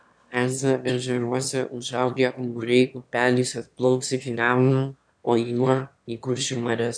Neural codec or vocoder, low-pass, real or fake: autoencoder, 22.05 kHz, a latent of 192 numbers a frame, VITS, trained on one speaker; 9.9 kHz; fake